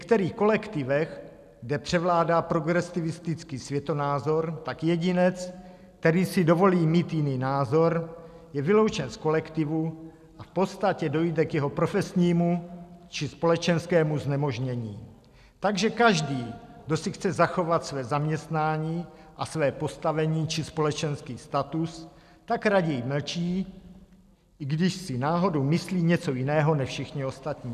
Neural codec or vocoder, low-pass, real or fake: none; 14.4 kHz; real